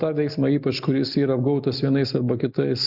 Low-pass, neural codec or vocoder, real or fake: 5.4 kHz; none; real